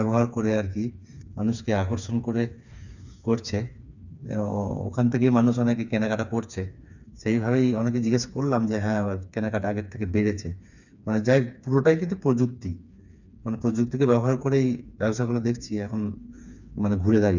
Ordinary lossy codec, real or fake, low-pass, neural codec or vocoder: none; fake; 7.2 kHz; codec, 16 kHz, 4 kbps, FreqCodec, smaller model